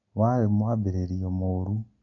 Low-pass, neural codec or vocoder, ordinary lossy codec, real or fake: 7.2 kHz; none; none; real